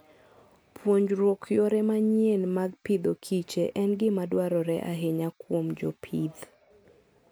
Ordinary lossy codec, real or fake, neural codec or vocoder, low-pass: none; real; none; none